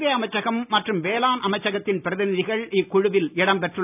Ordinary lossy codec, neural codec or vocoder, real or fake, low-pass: none; none; real; 3.6 kHz